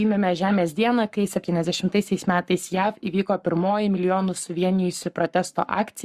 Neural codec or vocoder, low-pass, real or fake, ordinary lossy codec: codec, 44.1 kHz, 7.8 kbps, Pupu-Codec; 14.4 kHz; fake; Opus, 64 kbps